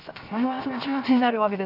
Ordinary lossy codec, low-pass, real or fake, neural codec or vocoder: none; 5.4 kHz; fake; codec, 16 kHz, 0.7 kbps, FocalCodec